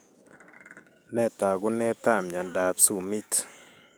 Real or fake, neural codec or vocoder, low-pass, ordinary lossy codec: fake; codec, 44.1 kHz, 7.8 kbps, DAC; none; none